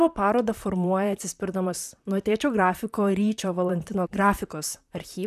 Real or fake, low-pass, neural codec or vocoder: fake; 14.4 kHz; vocoder, 44.1 kHz, 128 mel bands, Pupu-Vocoder